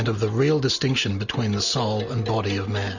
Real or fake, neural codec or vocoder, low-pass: real; none; 7.2 kHz